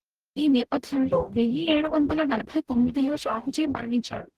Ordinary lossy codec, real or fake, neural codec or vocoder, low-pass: Opus, 16 kbps; fake; codec, 44.1 kHz, 0.9 kbps, DAC; 14.4 kHz